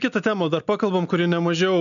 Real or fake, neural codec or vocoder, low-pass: real; none; 7.2 kHz